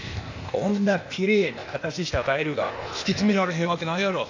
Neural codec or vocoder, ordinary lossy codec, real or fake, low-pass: codec, 16 kHz, 0.8 kbps, ZipCodec; AAC, 48 kbps; fake; 7.2 kHz